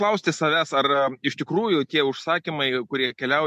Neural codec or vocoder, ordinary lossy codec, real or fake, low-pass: none; MP3, 64 kbps; real; 14.4 kHz